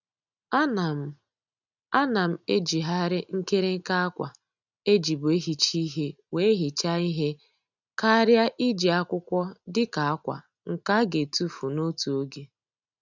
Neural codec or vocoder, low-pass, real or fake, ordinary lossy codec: none; 7.2 kHz; real; none